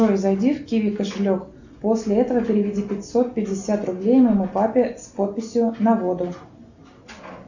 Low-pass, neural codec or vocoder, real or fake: 7.2 kHz; none; real